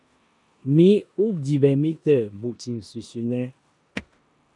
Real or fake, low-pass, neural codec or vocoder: fake; 10.8 kHz; codec, 16 kHz in and 24 kHz out, 0.9 kbps, LongCat-Audio-Codec, four codebook decoder